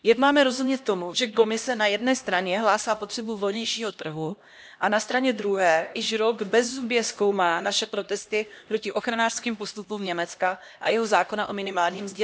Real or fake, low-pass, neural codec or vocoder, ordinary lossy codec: fake; none; codec, 16 kHz, 1 kbps, X-Codec, HuBERT features, trained on LibriSpeech; none